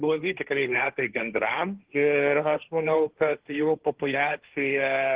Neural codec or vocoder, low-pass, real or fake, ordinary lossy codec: codec, 16 kHz, 1.1 kbps, Voila-Tokenizer; 3.6 kHz; fake; Opus, 16 kbps